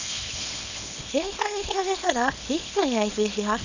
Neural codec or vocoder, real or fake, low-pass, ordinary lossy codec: codec, 24 kHz, 0.9 kbps, WavTokenizer, small release; fake; 7.2 kHz; none